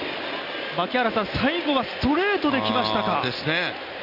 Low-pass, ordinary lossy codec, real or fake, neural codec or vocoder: 5.4 kHz; none; real; none